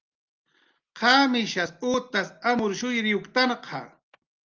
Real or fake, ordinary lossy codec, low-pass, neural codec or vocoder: real; Opus, 24 kbps; 7.2 kHz; none